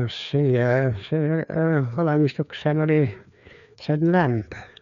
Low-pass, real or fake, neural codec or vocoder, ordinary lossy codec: 7.2 kHz; fake; codec, 16 kHz, 2 kbps, FreqCodec, larger model; none